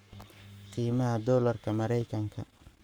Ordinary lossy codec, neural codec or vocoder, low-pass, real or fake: none; codec, 44.1 kHz, 7.8 kbps, Pupu-Codec; none; fake